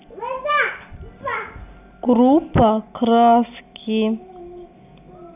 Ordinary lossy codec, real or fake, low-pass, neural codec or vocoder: none; real; 3.6 kHz; none